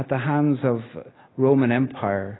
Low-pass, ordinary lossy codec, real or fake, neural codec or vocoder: 7.2 kHz; AAC, 16 kbps; real; none